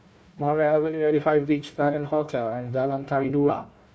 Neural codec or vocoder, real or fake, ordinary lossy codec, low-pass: codec, 16 kHz, 1 kbps, FunCodec, trained on Chinese and English, 50 frames a second; fake; none; none